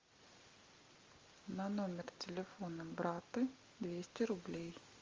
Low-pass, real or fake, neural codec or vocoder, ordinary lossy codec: 7.2 kHz; real; none; Opus, 32 kbps